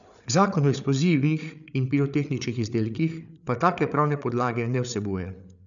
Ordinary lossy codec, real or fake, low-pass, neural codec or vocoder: none; fake; 7.2 kHz; codec, 16 kHz, 8 kbps, FreqCodec, larger model